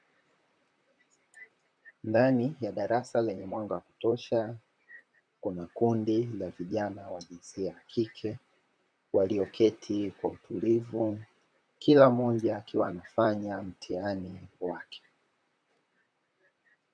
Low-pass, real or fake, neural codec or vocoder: 9.9 kHz; fake; vocoder, 44.1 kHz, 128 mel bands, Pupu-Vocoder